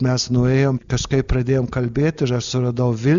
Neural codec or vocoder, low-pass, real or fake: none; 7.2 kHz; real